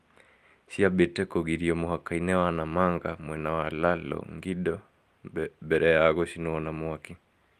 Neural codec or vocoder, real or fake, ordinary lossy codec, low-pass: none; real; Opus, 32 kbps; 14.4 kHz